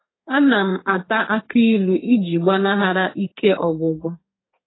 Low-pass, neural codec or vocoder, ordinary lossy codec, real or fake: 7.2 kHz; codec, 32 kHz, 1.9 kbps, SNAC; AAC, 16 kbps; fake